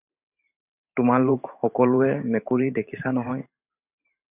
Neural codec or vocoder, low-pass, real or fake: vocoder, 44.1 kHz, 128 mel bands every 512 samples, BigVGAN v2; 3.6 kHz; fake